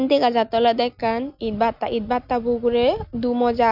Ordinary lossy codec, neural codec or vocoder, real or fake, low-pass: none; none; real; 5.4 kHz